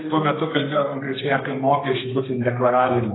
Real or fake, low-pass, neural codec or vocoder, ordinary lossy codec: fake; 7.2 kHz; codec, 32 kHz, 1.9 kbps, SNAC; AAC, 16 kbps